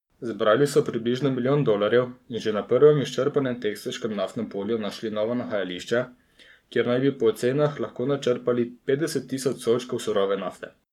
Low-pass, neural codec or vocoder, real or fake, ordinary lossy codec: 19.8 kHz; codec, 44.1 kHz, 7.8 kbps, Pupu-Codec; fake; none